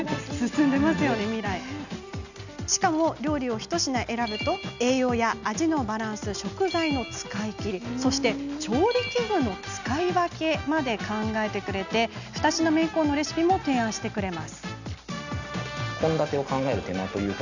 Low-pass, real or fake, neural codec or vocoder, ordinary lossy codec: 7.2 kHz; real; none; none